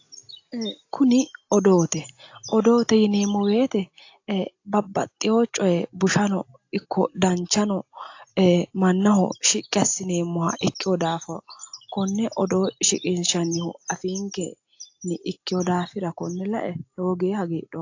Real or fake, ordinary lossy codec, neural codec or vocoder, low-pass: real; AAC, 48 kbps; none; 7.2 kHz